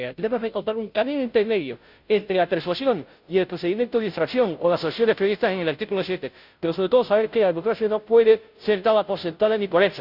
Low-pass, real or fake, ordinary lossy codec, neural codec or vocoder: 5.4 kHz; fake; none; codec, 16 kHz, 0.5 kbps, FunCodec, trained on Chinese and English, 25 frames a second